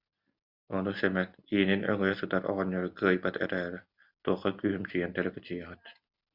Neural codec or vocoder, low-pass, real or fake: none; 5.4 kHz; real